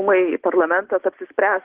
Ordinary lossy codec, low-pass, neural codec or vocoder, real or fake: Opus, 16 kbps; 3.6 kHz; none; real